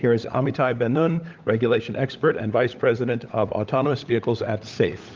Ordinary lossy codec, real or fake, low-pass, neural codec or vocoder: Opus, 24 kbps; fake; 7.2 kHz; codec, 16 kHz, 16 kbps, FunCodec, trained on LibriTTS, 50 frames a second